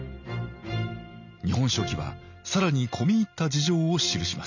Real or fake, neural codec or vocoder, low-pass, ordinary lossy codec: real; none; 7.2 kHz; none